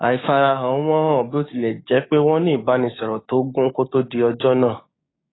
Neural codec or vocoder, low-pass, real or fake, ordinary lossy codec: codec, 16 kHz, 6 kbps, DAC; 7.2 kHz; fake; AAC, 16 kbps